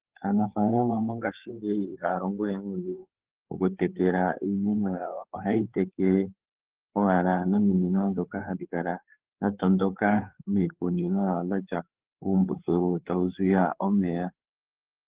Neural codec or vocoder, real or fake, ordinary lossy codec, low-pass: codec, 16 kHz, 4 kbps, FreqCodec, larger model; fake; Opus, 16 kbps; 3.6 kHz